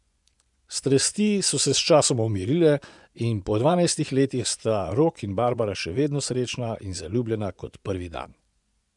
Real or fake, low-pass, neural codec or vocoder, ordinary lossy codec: fake; 10.8 kHz; vocoder, 24 kHz, 100 mel bands, Vocos; none